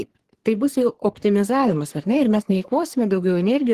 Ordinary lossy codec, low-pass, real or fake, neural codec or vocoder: Opus, 16 kbps; 14.4 kHz; fake; codec, 44.1 kHz, 3.4 kbps, Pupu-Codec